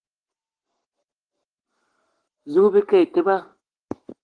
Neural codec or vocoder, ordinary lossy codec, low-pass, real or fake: codec, 44.1 kHz, 7.8 kbps, Pupu-Codec; Opus, 16 kbps; 9.9 kHz; fake